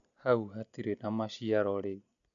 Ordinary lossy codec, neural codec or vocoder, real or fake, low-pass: none; none; real; 7.2 kHz